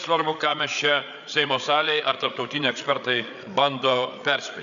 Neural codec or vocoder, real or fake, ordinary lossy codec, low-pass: codec, 16 kHz, 8 kbps, FreqCodec, larger model; fake; MP3, 96 kbps; 7.2 kHz